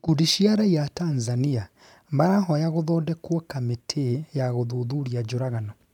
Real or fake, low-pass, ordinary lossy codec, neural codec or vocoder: fake; 19.8 kHz; none; vocoder, 44.1 kHz, 128 mel bands every 512 samples, BigVGAN v2